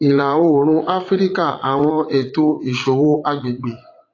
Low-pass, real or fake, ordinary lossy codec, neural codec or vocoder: 7.2 kHz; fake; AAC, 32 kbps; vocoder, 44.1 kHz, 80 mel bands, Vocos